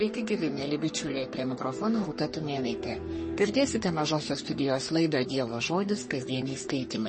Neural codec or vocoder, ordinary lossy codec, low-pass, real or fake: codec, 44.1 kHz, 3.4 kbps, Pupu-Codec; MP3, 32 kbps; 10.8 kHz; fake